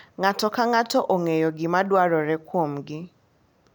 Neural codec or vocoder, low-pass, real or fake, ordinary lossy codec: none; 19.8 kHz; real; none